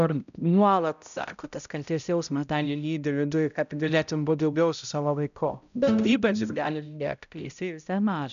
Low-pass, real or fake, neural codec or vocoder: 7.2 kHz; fake; codec, 16 kHz, 0.5 kbps, X-Codec, HuBERT features, trained on balanced general audio